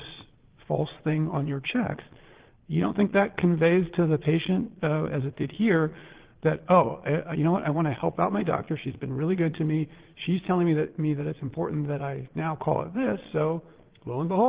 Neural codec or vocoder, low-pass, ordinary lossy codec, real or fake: vocoder, 22.05 kHz, 80 mel bands, Vocos; 3.6 kHz; Opus, 16 kbps; fake